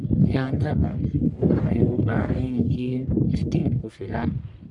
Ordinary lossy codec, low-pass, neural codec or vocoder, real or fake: none; 10.8 kHz; codec, 44.1 kHz, 1.7 kbps, Pupu-Codec; fake